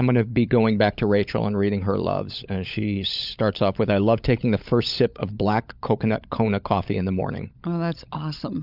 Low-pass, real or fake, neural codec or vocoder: 5.4 kHz; fake; codec, 16 kHz, 16 kbps, FunCodec, trained on LibriTTS, 50 frames a second